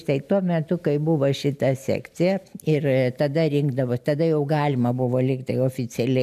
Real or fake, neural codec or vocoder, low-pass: real; none; 14.4 kHz